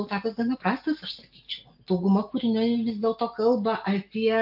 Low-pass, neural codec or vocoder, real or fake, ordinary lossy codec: 5.4 kHz; none; real; AAC, 48 kbps